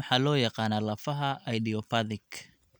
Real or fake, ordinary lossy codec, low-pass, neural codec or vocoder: real; none; none; none